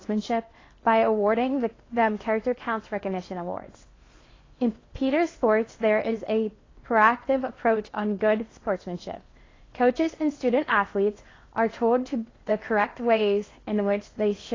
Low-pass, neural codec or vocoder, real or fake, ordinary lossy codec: 7.2 kHz; codec, 16 kHz in and 24 kHz out, 0.8 kbps, FocalCodec, streaming, 65536 codes; fake; AAC, 32 kbps